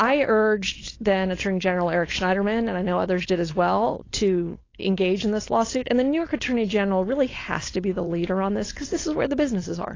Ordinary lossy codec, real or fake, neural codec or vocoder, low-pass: AAC, 32 kbps; fake; codec, 16 kHz, 4.8 kbps, FACodec; 7.2 kHz